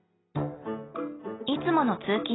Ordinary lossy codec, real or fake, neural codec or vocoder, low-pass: AAC, 16 kbps; real; none; 7.2 kHz